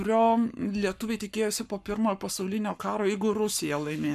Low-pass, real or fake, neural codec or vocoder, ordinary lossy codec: 14.4 kHz; fake; codec, 44.1 kHz, 7.8 kbps, Pupu-Codec; MP3, 96 kbps